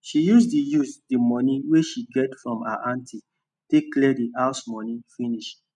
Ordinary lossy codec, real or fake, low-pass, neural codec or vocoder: none; real; 10.8 kHz; none